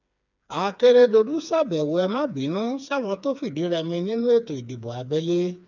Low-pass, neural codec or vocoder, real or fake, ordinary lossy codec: 7.2 kHz; codec, 16 kHz, 4 kbps, FreqCodec, smaller model; fake; none